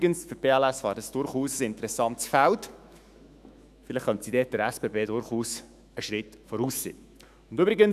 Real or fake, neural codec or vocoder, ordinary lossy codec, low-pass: fake; autoencoder, 48 kHz, 128 numbers a frame, DAC-VAE, trained on Japanese speech; none; 14.4 kHz